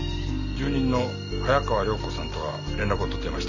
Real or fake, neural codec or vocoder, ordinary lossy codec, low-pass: real; none; none; 7.2 kHz